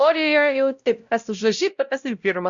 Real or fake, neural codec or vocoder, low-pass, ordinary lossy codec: fake; codec, 16 kHz, 0.5 kbps, X-Codec, WavLM features, trained on Multilingual LibriSpeech; 7.2 kHz; Opus, 64 kbps